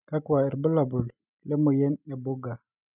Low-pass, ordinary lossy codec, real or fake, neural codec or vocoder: 3.6 kHz; none; real; none